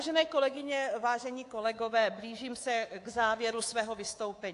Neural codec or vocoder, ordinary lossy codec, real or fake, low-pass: codec, 24 kHz, 3.1 kbps, DualCodec; AAC, 48 kbps; fake; 10.8 kHz